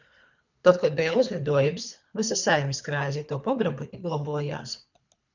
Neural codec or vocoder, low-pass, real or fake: codec, 24 kHz, 3 kbps, HILCodec; 7.2 kHz; fake